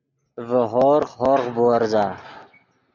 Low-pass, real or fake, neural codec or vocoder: 7.2 kHz; real; none